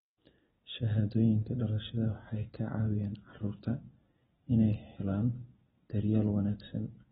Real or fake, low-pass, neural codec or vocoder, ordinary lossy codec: real; 19.8 kHz; none; AAC, 16 kbps